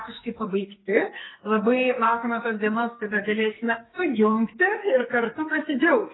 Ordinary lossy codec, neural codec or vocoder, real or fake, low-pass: AAC, 16 kbps; codec, 44.1 kHz, 2.6 kbps, SNAC; fake; 7.2 kHz